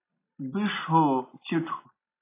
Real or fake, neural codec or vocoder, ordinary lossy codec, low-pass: real; none; AAC, 24 kbps; 3.6 kHz